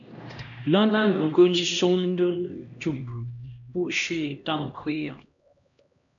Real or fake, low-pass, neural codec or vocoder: fake; 7.2 kHz; codec, 16 kHz, 1 kbps, X-Codec, HuBERT features, trained on LibriSpeech